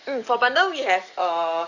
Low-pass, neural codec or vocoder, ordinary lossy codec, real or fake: 7.2 kHz; codec, 44.1 kHz, 7.8 kbps, DAC; none; fake